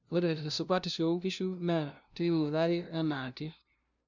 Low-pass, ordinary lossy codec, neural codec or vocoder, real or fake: 7.2 kHz; none; codec, 16 kHz, 0.5 kbps, FunCodec, trained on LibriTTS, 25 frames a second; fake